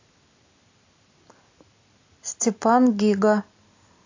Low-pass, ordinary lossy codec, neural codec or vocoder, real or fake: 7.2 kHz; AAC, 48 kbps; none; real